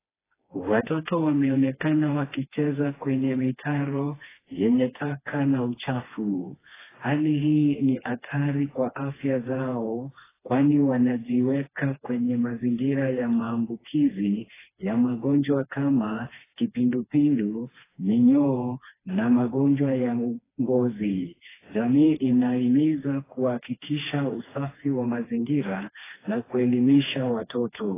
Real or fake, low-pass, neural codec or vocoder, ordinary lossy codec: fake; 3.6 kHz; codec, 16 kHz, 2 kbps, FreqCodec, smaller model; AAC, 16 kbps